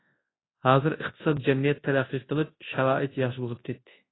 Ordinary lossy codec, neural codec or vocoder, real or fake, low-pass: AAC, 16 kbps; codec, 24 kHz, 0.9 kbps, WavTokenizer, large speech release; fake; 7.2 kHz